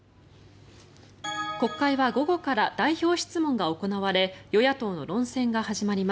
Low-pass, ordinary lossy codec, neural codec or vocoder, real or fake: none; none; none; real